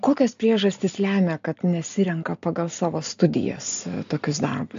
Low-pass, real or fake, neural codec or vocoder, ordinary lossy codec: 7.2 kHz; real; none; AAC, 64 kbps